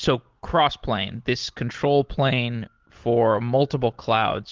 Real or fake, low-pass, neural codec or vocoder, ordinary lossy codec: real; 7.2 kHz; none; Opus, 16 kbps